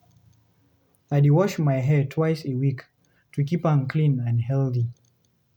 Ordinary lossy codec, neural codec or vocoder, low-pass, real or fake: none; none; 19.8 kHz; real